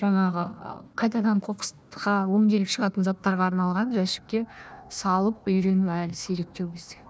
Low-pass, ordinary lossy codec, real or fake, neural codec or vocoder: none; none; fake; codec, 16 kHz, 1 kbps, FunCodec, trained on Chinese and English, 50 frames a second